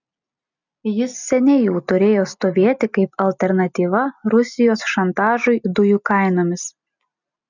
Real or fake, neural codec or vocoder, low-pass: real; none; 7.2 kHz